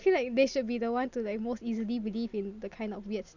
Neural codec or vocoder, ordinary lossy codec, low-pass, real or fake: none; none; 7.2 kHz; real